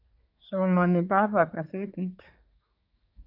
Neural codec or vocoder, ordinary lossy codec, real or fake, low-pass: codec, 24 kHz, 1 kbps, SNAC; none; fake; 5.4 kHz